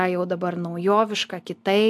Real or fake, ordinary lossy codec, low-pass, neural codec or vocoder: real; AAC, 96 kbps; 14.4 kHz; none